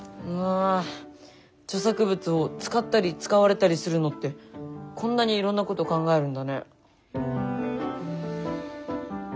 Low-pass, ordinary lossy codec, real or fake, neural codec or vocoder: none; none; real; none